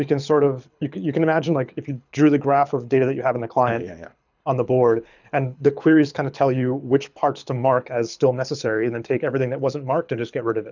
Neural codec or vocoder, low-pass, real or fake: codec, 24 kHz, 6 kbps, HILCodec; 7.2 kHz; fake